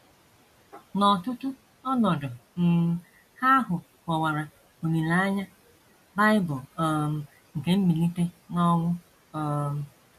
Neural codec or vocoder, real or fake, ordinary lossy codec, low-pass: none; real; MP3, 64 kbps; 14.4 kHz